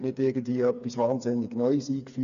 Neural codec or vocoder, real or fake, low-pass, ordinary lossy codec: codec, 16 kHz, 4 kbps, FreqCodec, smaller model; fake; 7.2 kHz; none